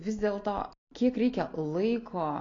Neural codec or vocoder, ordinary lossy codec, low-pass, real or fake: none; AAC, 32 kbps; 7.2 kHz; real